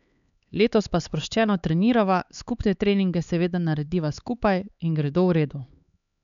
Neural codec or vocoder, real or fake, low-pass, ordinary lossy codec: codec, 16 kHz, 4 kbps, X-Codec, HuBERT features, trained on LibriSpeech; fake; 7.2 kHz; none